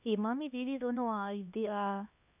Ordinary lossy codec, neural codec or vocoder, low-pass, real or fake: none; codec, 16 kHz, 2 kbps, X-Codec, HuBERT features, trained on LibriSpeech; 3.6 kHz; fake